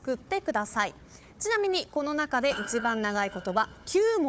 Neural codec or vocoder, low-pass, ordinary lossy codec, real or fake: codec, 16 kHz, 4 kbps, FunCodec, trained on Chinese and English, 50 frames a second; none; none; fake